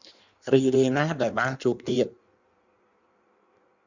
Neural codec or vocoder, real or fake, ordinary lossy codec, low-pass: codec, 16 kHz in and 24 kHz out, 1.1 kbps, FireRedTTS-2 codec; fake; Opus, 64 kbps; 7.2 kHz